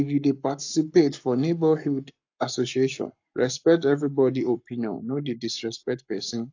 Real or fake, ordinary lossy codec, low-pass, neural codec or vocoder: fake; AAC, 48 kbps; 7.2 kHz; codec, 44.1 kHz, 7.8 kbps, Pupu-Codec